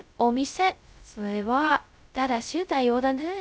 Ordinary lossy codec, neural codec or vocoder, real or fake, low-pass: none; codec, 16 kHz, 0.2 kbps, FocalCodec; fake; none